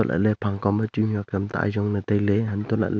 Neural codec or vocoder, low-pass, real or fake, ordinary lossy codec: none; none; real; none